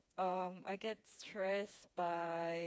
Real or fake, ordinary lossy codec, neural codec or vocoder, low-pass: fake; none; codec, 16 kHz, 4 kbps, FreqCodec, smaller model; none